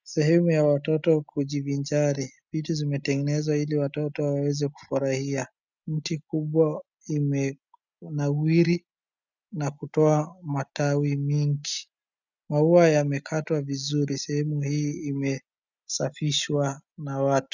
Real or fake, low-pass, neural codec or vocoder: real; 7.2 kHz; none